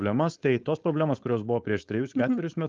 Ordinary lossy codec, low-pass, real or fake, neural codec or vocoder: Opus, 32 kbps; 7.2 kHz; fake; codec, 16 kHz, 4.8 kbps, FACodec